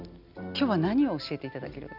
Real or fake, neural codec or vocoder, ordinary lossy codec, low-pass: real; none; none; 5.4 kHz